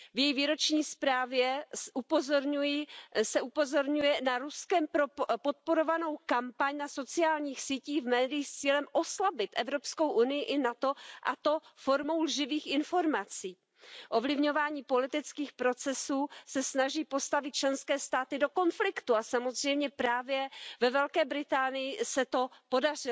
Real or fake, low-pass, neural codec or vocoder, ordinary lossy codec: real; none; none; none